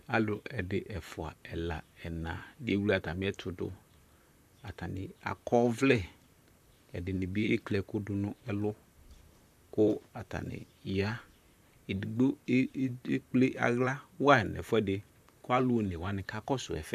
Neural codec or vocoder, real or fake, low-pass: vocoder, 44.1 kHz, 128 mel bands, Pupu-Vocoder; fake; 14.4 kHz